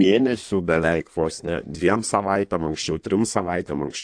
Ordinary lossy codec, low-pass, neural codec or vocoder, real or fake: AAC, 64 kbps; 9.9 kHz; codec, 16 kHz in and 24 kHz out, 1.1 kbps, FireRedTTS-2 codec; fake